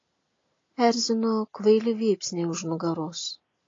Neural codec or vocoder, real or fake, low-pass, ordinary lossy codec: none; real; 7.2 kHz; AAC, 32 kbps